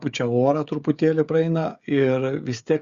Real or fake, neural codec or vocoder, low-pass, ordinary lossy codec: fake; codec, 16 kHz, 16 kbps, FreqCodec, smaller model; 7.2 kHz; Opus, 64 kbps